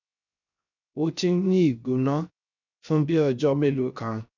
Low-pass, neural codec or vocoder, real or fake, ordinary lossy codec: 7.2 kHz; codec, 16 kHz, 0.3 kbps, FocalCodec; fake; none